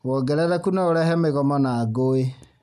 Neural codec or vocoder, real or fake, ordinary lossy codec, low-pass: none; real; none; 14.4 kHz